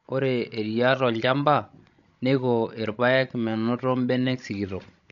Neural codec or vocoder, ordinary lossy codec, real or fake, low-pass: codec, 16 kHz, 16 kbps, FreqCodec, larger model; none; fake; 7.2 kHz